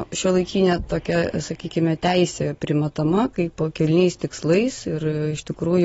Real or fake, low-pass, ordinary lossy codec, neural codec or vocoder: real; 19.8 kHz; AAC, 24 kbps; none